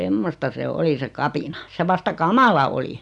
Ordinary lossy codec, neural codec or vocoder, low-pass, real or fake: none; none; 10.8 kHz; real